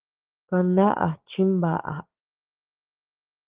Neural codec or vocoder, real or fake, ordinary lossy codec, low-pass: codec, 16 kHz, 6 kbps, DAC; fake; Opus, 16 kbps; 3.6 kHz